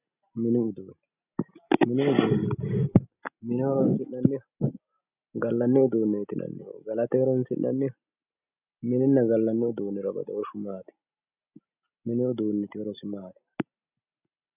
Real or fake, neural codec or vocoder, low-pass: real; none; 3.6 kHz